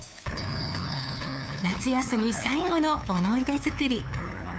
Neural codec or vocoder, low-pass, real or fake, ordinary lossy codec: codec, 16 kHz, 2 kbps, FunCodec, trained on LibriTTS, 25 frames a second; none; fake; none